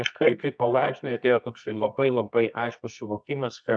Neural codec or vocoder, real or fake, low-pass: codec, 24 kHz, 0.9 kbps, WavTokenizer, medium music audio release; fake; 9.9 kHz